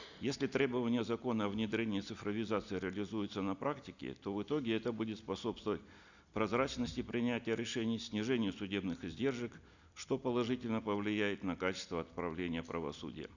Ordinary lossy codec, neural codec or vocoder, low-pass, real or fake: none; none; 7.2 kHz; real